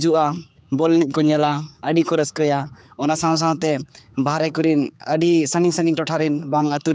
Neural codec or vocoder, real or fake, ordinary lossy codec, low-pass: codec, 16 kHz, 4 kbps, X-Codec, HuBERT features, trained on general audio; fake; none; none